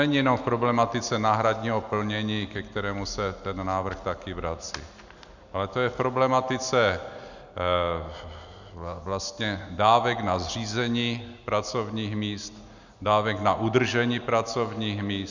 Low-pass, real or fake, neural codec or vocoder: 7.2 kHz; real; none